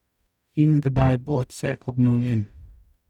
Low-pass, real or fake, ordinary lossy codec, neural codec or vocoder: 19.8 kHz; fake; none; codec, 44.1 kHz, 0.9 kbps, DAC